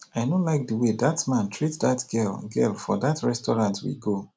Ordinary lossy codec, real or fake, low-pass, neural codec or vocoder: none; real; none; none